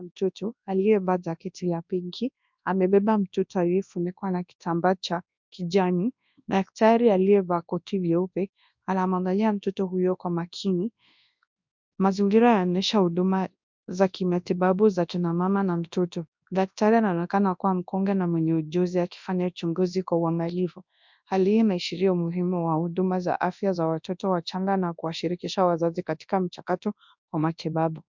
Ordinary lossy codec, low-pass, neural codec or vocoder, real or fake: MP3, 64 kbps; 7.2 kHz; codec, 24 kHz, 0.9 kbps, WavTokenizer, large speech release; fake